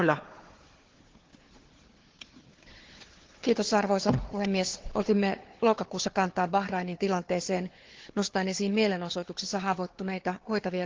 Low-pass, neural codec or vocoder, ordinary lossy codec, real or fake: 7.2 kHz; codec, 16 kHz, 4 kbps, FunCodec, trained on Chinese and English, 50 frames a second; Opus, 16 kbps; fake